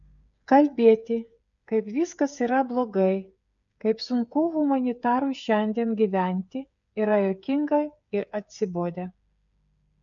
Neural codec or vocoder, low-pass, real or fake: codec, 16 kHz, 8 kbps, FreqCodec, smaller model; 7.2 kHz; fake